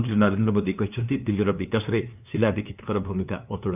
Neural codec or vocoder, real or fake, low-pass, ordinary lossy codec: codec, 16 kHz, 2 kbps, FunCodec, trained on LibriTTS, 25 frames a second; fake; 3.6 kHz; none